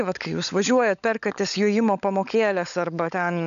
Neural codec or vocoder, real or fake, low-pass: codec, 16 kHz, 16 kbps, FunCodec, trained on LibriTTS, 50 frames a second; fake; 7.2 kHz